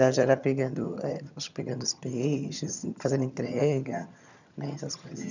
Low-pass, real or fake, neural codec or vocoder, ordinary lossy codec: 7.2 kHz; fake; vocoder, 22.05 kHz, 80 mel bands, HiFi-GAN; none